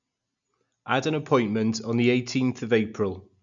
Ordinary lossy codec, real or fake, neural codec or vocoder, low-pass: MP3, 96 kbps; real; none; 7.2 kHz